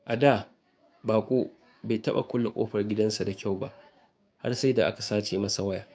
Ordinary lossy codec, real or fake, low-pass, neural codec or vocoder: none; fake; none; codec, 16 kHz, 6 kbps, DAC